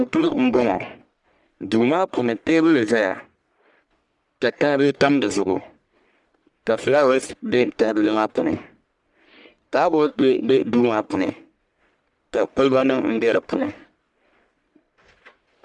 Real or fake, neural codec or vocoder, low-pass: fake; codec, 44.1 kHz, 1.7 kbps, Pupu-Codec; 10.8 kHz